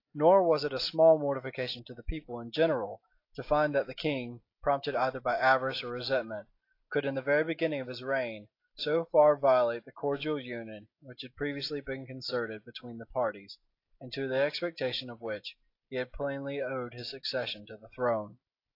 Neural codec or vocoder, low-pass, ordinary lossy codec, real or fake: none; 5.4 kHz; AAC, 32 kbps; real